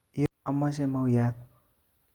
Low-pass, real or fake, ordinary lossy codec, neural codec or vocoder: 19.8 kHz; real; Opus, 24 kbps; none